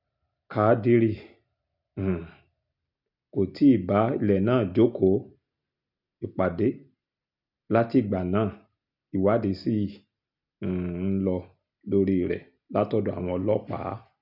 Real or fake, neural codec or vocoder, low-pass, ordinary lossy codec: real; none; 5.4 kHz; none